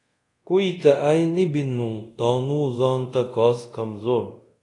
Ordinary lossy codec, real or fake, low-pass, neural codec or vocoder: AAC, 48 kbps; fake; 10.8 kHz; codec, 24 kHz, 0.5 kbps, DualCodec